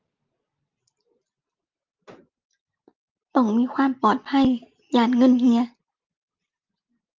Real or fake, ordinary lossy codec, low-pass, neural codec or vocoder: real; Opus, 24 kbps; 7.2 kHz; none